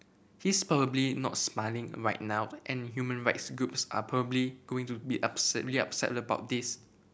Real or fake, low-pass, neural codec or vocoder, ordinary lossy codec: real; none; none; none